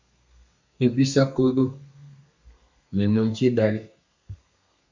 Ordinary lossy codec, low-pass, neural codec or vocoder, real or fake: MP3, 64 kbps; 7.2 kHz; codec, 32 kHz, 1.9 kbps, SNAC; fake